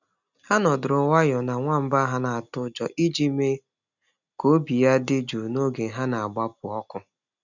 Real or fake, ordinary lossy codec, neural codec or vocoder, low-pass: real; none; none; 7.2 kHz